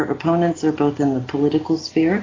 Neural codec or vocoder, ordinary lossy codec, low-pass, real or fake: none; AAC, 32 kbps; 7.2 kHz; real